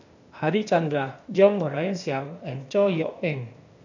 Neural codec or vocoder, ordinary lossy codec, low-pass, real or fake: codec, 16 kHz, 0.8 kbps, ZipCodec; none; 7.2 kHz; fake